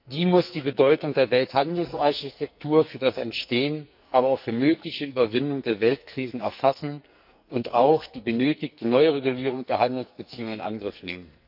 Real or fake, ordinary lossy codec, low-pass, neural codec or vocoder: fake; none; 5.4 kHz; codec, 44.1 kHz, 2.6 kbps, SNAC